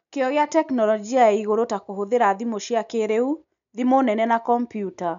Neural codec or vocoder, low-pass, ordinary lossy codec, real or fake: none; 7.2 kHz; none; real